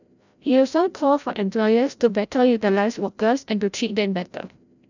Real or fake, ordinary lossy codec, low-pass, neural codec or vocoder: fake; none; 7.2 kHz; codec, 16 kHz, 0.5 kbps, FreqCodec, larger model